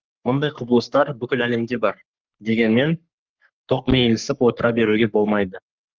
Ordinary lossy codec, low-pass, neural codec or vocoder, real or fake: Opus, 32 kbps; 7.2 kHz; codec, 44.1 kHz, 3.4 kbps, Pupu-Codec; fake